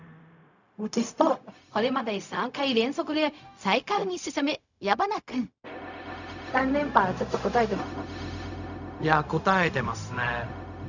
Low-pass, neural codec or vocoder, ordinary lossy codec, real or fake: 7.2 kHz; codec, 16 kHz, 0.4 kbps, LongCat-Audio-Codec; none; fake